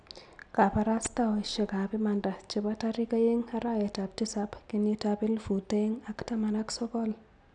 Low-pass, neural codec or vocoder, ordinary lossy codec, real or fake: 9.9 kHz; none; none; real